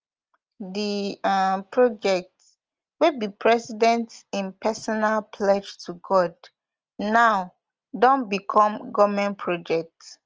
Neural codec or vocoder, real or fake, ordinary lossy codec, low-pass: none; real; Opus, 24 kbps; 7.2 kHz